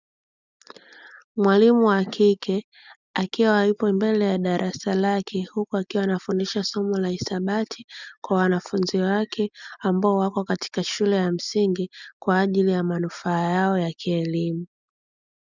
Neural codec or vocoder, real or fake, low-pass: none; real; 7.2 kHz